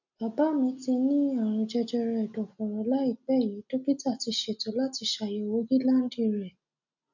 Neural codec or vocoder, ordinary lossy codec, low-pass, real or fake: none; none; 7.2 kHz; real